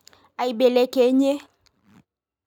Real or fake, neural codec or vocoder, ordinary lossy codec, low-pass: real; none; none; 19.8 kHz